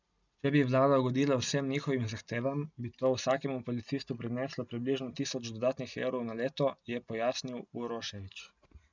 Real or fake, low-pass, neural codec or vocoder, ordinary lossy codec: real; 7.2 kHz; none; none